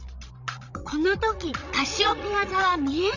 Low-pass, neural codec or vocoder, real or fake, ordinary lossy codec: 7.2 kHz; codec, 16 kHz, 16 kbps, FreqCodec, larger model; fake; none